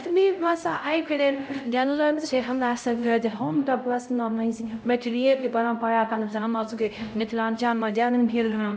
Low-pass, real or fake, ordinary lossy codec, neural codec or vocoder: none; fake; none; codec, 16 kHz, 0.5 kbps, X-Codec, HuBERT features, trained on LibriSpeech